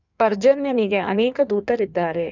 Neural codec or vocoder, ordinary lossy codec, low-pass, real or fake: codec, 16 kHz in and 24 kHz out, 1.1 kbps, FireRedTTS-2 codec; none; 7.2 kHz; fake